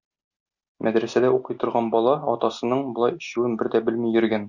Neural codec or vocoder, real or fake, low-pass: none; real; 7.2 kHz